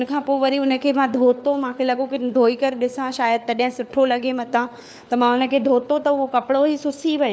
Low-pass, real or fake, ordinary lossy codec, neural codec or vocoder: none; fake; none; codec, 16 kHz, 4 kbps, FunCodec, trained on LibriTTS, 50 frames a second